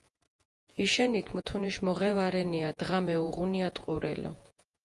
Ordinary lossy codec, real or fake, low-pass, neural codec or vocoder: Opus, 32 kbps; fake; 10.8 kHz; vocoder, 48 kHz, 128 mel bands, Vocos